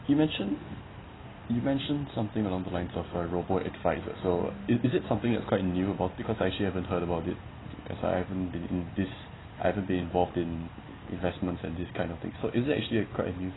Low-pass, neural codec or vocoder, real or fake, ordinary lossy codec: 7.2 kHz; none; real; AAC, 16 kbps